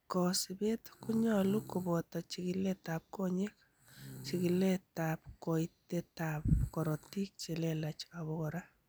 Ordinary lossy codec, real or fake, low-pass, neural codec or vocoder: none; real; none; none